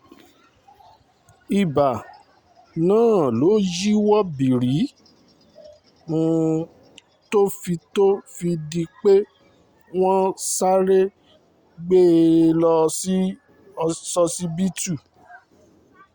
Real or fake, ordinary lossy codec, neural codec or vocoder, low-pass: real; Opus, 64 kbps; none; 19.8 kHz